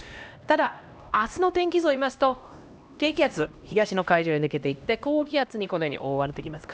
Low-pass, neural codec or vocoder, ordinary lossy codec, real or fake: none; codec, 16 kHz, 1 kbps, X-Codec, HuBERT features, trained on LibriSpeech; none; fake